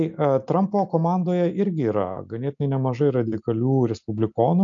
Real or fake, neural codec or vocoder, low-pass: real; none; 7.2 kHz